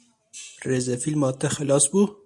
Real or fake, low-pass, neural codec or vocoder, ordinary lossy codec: real; 10.8 kHz; none; MP3, 64 kbps